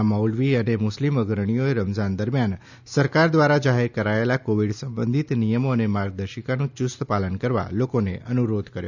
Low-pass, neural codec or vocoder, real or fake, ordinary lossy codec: 7.2 kHz; none; real; none